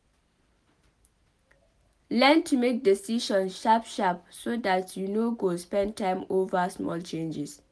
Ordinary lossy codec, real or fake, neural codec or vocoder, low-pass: none; real; none; none